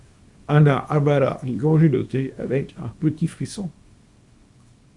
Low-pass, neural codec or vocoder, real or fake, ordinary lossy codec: 10.8 kHz; codec, 24 kHz, 0.9 kbps, WavTokenizer, small release; fake; Opus, 64 kbps